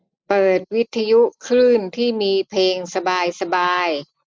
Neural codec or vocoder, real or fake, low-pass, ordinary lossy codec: none; real; none; none